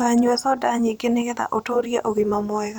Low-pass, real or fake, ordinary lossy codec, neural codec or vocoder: none; fake; none; vocoder, 44.1 kHz, 128 mel bands every 512 samples, BigVGAN v2